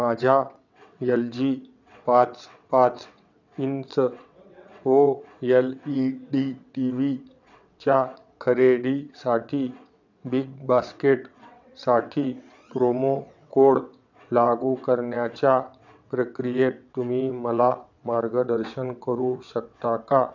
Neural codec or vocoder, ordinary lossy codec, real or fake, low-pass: vocoder, 22.05 kHz, 80 mel bands, WaveNeXt; none; fake; 7.2 kHz